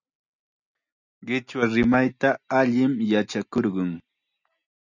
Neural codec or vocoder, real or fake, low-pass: none; real; 7.2 kHz